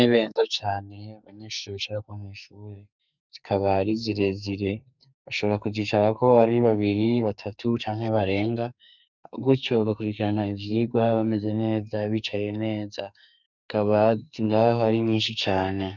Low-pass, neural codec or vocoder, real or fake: 7.2 kHz; codec, 44.1 kHz, 2.6 kbps, SNAC; fake